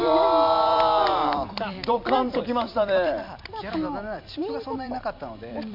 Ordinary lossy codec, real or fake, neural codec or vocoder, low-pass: MP3, 48 kbps; fake; vocoder, 44.1 kHz, 128 mel bands every 256 samples, BigVGAN v2; 5.4 kHz